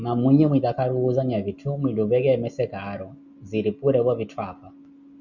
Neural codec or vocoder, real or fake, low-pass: none; real; 7.2 kHz